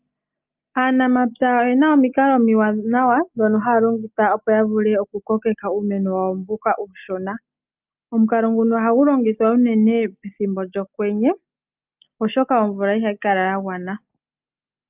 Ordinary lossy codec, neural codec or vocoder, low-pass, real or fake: Opus, 24 kbps; none; 3.6 kHz; real